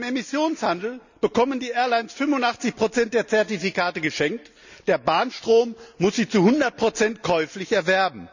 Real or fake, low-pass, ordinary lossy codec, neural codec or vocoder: real; 7.2 kHz; none; none